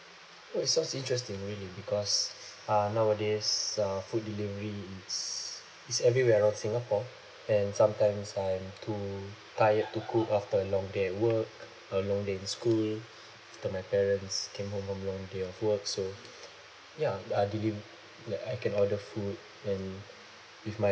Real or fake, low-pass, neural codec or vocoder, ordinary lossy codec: real; none; none; none